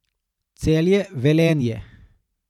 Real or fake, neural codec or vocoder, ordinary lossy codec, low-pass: fake; vocoder, 44.1 kHz, 128 mel bands every 512 samples, BigVGAN v2; none; 19.8 kHz